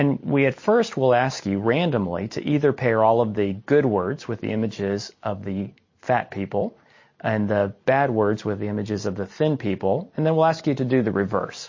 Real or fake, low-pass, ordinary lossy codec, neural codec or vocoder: real; 7.2 kHz; MP3, 32 kbps; none